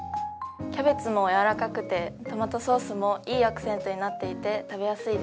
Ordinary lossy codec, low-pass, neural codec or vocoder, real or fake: none; none; none; real